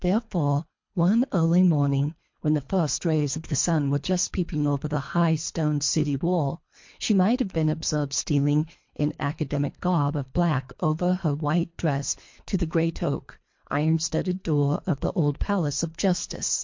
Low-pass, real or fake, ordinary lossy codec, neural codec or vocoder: 7.2 kHz; fake; MP3, 48 kbps; codec, 24 kHz, 3 kbps, HILCodec